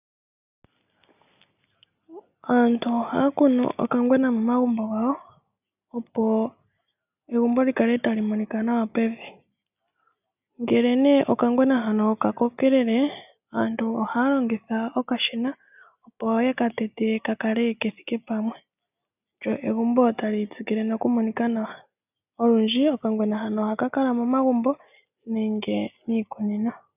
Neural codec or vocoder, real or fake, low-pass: none; real; 3.6 kHz